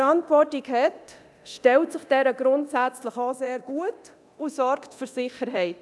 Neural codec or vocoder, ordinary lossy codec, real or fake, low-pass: codec, 24 kHz, 0.9 kbps, DualCodec; none; fake; none